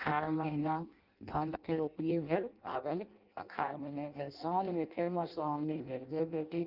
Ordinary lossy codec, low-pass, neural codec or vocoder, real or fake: Opus, 24 kbps; 5.4 kHz; codec, 16 kHz in and 24 kHz out, 0.6 kbps, FireRedTTS-2 codec; fake